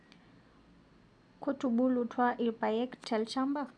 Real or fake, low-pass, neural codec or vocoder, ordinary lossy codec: real; 9.9 kHz; none; none